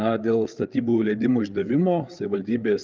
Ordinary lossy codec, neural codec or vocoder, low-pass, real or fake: Opus, 32 kbps; codec, 16 kHz, 8 kbps, FreqCodec, larger model; 7.2 kHz; fake